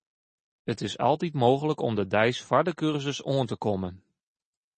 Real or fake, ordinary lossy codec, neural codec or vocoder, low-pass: real; MP3, 32 kbps; none; 10.8 kHz